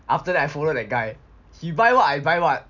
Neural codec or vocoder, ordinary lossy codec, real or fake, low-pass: none; none; real; 7.2 kHz